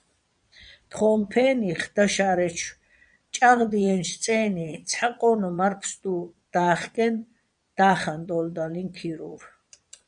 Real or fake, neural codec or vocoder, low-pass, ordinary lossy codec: fake; vocoder, 22.05 kHz, 80 mel bands, Vocos; 9.9 kHz; MP3, 96 kbps